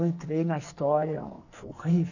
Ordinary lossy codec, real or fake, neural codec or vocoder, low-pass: none; fake; codec, 44.1 kHz, 2.6 kbps, SNAC; 7.2 kHz